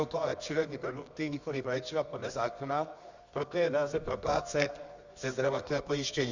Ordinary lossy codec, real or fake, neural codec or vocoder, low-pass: Opus, 64 kbps; fake; codec, 24 kHz, 0.9 kbps, WavTokenizer, medium music audio release; 7.2 kHz